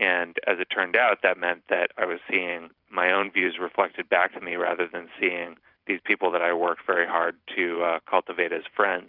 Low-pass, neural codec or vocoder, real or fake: 5.4 kHz; vocoder, 44.1 kHz, 128 mel bands every 256 samples, BigVGAN v2; fake